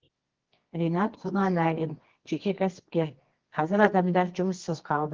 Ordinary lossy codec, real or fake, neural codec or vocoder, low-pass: Opus, 16 kbps; fake; codec, 24 kHz, 0.9 kbps, WavTokenizer, medium music audio release; 7.2 kHz